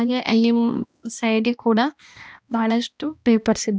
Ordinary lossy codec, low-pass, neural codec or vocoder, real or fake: none; none; codec, 16 kHz, 1 kbps, X-Codec, HuBERT features, trained on balanced general audio; fake